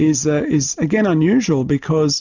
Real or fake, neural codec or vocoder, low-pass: real; none; 7.2 kHz